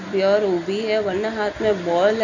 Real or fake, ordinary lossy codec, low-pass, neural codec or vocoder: real; none; 7.2 kHz; none